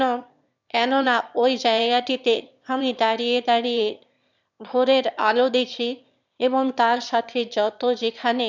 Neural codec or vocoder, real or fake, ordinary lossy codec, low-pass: autoencoder, 22.05 kHz, a latent of 192 numbers a frame, VITS, trained on one speaker; fake; none; 7.2 kHz